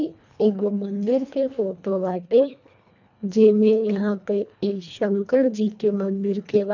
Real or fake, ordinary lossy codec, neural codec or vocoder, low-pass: fake; none; codec, 24 kHz, 1.5 kbps, HILCodec; 7.2 kHz